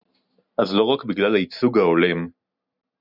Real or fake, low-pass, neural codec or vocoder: real; 5.4 kHz; none